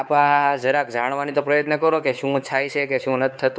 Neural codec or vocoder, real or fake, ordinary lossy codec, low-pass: codec, 16 kHz, 4 kbps, X-Codec, WavLM features, trained on Multilingual LibriSpeech; fake; none; none